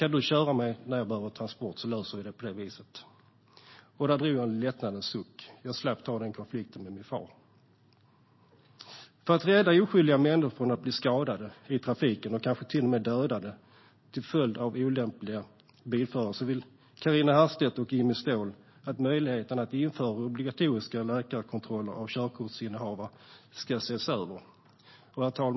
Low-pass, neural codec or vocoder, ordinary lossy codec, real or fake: 7.2 kHz; none; MP3, 24 kbps; real